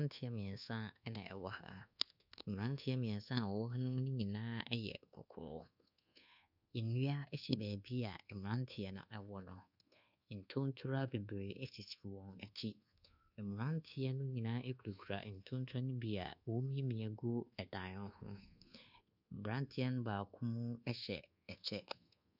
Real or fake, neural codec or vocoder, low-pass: fake; codec, 24 kHz, 1.2 kbps, DualCodec; 5.4 kHz